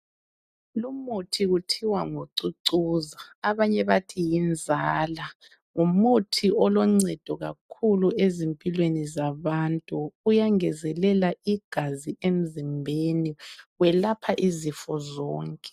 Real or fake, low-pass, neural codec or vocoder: real; 14.4 kHz; none